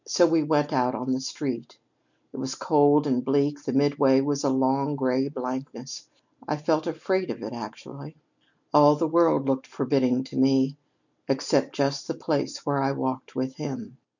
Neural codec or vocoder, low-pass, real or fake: none; 7.2 kHz; real